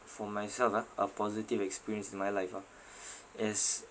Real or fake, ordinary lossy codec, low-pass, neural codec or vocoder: real; none; none; none